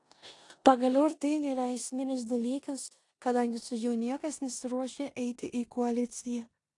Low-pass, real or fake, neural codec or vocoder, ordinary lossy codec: 10.8 kHz; fake; codec, 16 kHz in and 24 kHz out, 0.9 kbps, LongCat-Audio-Codec, four codebook decoder; AAC, 48 kbps